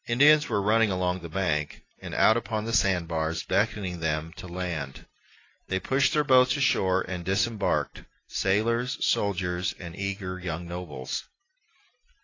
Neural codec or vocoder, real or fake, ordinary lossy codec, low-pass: none; real; AAC, 32 kbps; 7.2 kHz